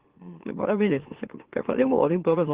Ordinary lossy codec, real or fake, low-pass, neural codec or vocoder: Opus, 16 kbps; fake; 3.6 kHz; autoencoder, 44.1 kHz, a latent of 192 numbers a frame, MeloTTS